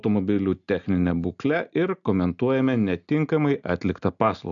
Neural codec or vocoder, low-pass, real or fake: none; 7.2 kHz; real